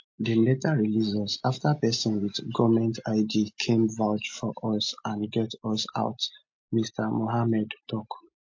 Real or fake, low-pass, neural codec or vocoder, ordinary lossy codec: real; 7.2 kHz; none; MP3, 48 kbps